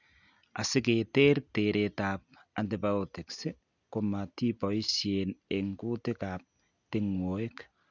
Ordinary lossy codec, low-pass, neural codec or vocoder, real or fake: none; 7.2 kHz; none; real